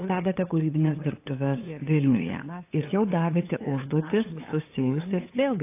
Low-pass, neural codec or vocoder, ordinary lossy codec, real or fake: 3.6 kHz; codec, 16 kHz, 8 kbps, FunCodec, trained on LibriTTS, 25 frames a second; AAC, 24 kbps; fake